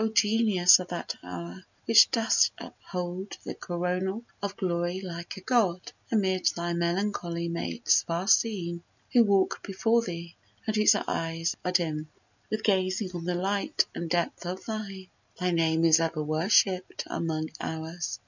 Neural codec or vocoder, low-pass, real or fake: none; 7.2 kHz; real